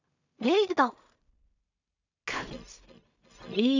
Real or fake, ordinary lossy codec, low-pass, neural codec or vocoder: fake; none; 7.2 kHz; codec, 16 kHz in and 24 kHz out, 0.4 kbps, LongCat-Audio-Codec, two codebook decoder